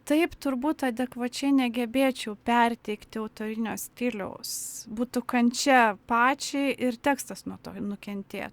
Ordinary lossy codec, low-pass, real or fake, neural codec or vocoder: Opus, 64 kbps; 19.8 kHz; real; none